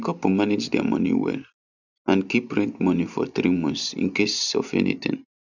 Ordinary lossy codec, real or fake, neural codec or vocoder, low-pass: none; real; none; 7.2 kHz